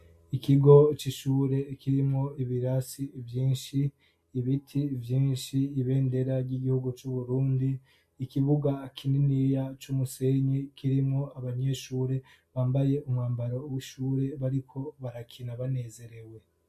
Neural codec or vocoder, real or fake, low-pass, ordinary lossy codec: none; real; 14.4 kHz; MP3, 64 kbps